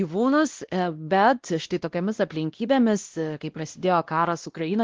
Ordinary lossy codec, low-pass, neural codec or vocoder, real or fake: Opus, 16 kbps; 7.2 kHz; codec, 16 kHz, 1 kbps, X-Codec, WavLM features, trained on Multilingual LibriSpeech; fake